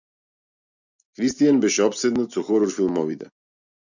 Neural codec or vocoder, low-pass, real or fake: none; 7.2 kHz; real